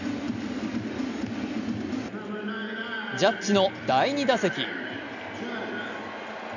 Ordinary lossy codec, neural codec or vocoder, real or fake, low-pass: none; none; real; 7.2 kHz